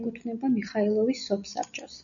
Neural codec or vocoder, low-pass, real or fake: none; 7.2 kHz; real